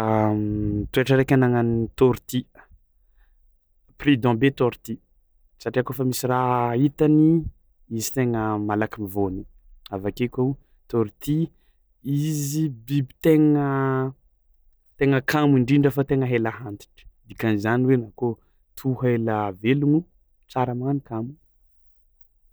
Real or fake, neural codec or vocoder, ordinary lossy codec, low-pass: real; none; none; none